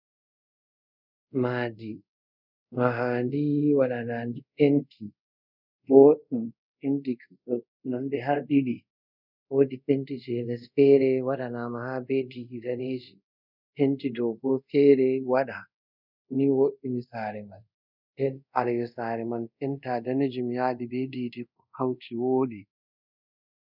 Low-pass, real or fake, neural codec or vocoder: 5.4 kHz; fake; codec, 24 kHz, 0.5 kbps, DualCodec